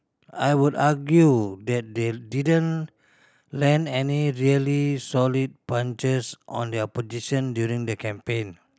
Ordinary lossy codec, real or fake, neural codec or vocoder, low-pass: none; real; none; none